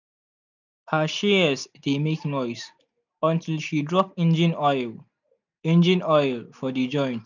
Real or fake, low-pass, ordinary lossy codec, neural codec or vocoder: real; 7.2 kHz; none; none